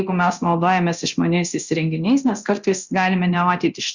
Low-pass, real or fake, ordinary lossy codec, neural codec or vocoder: 7.2 kHz; fake; Opus, 64 kbps; codec, 24 kHz, 0.9 kbps, DualCodec